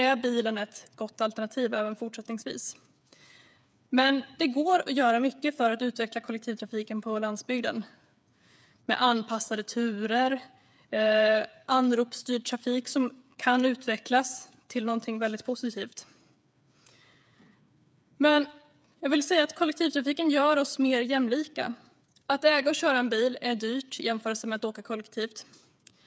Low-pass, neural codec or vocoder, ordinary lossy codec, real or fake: none; codec, 16 kHz, 8 kbps, FreqCodec, smaller model; none; fake